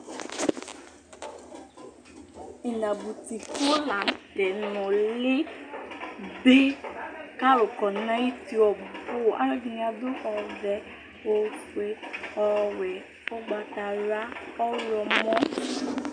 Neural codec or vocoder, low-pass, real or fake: none; 9.9 kHz; real